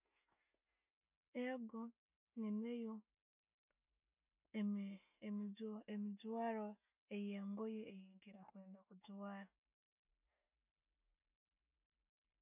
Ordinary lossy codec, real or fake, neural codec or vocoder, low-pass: none; real; none; 3.6 kHz